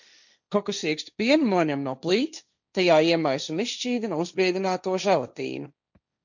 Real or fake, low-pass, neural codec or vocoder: fake; 7.2 kHz; codec, 16 kHz, 1.1 kbps, Voila-Tokenizer